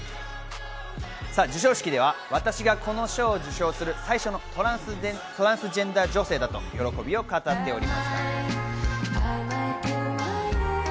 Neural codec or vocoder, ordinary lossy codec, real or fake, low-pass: none; none; real; none